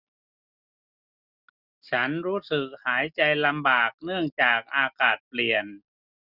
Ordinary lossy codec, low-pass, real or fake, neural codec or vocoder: Opus, 64 kbps; 5.4 kHz; real; none